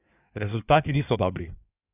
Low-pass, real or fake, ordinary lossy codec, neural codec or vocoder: 3.6 kHz; fake; none; codec, 16 kHz in and 24 kHz out, 1.1 kbps, FireRedTTS-2 codec